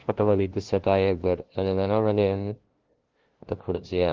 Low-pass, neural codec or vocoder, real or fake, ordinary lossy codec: 7.2 kHz; codec, 16 kHz, 0.5 kbps, FunCodec, trained on LibriTTS, 25 frames a second; fake; Opus, 16 kbps